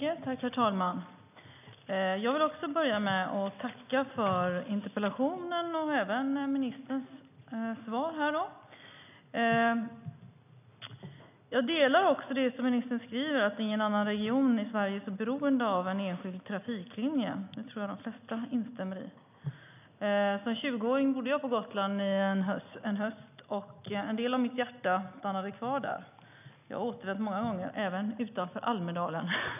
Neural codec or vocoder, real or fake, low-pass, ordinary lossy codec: none; real; 3.6 kHz; none